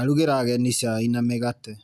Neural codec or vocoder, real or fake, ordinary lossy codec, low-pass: none; real; none; 14.4 kHz